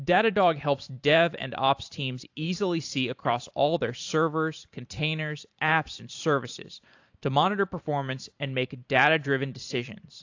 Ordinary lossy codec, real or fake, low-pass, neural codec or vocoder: AAC, 48 kbps; fake; 7.2 kHz; vocoder, 44.1 kHz, 128 mel bands every 512 samples, BigVGAN v2